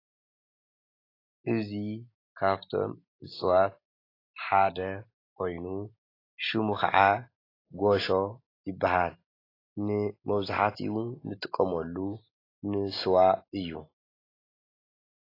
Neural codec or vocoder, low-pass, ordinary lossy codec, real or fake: none; 5.4 kHz; AAC, 24 kbps; real